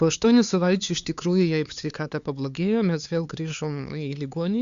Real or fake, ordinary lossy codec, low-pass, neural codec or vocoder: fake; Opus, 64 kbps; 7.2 kHz; codec, 16 kHz, 4 kbps, X-Codec, HuBERT features, trained on LibriSpeech